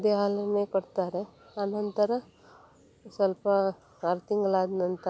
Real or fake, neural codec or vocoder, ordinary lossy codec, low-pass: real; none; none; none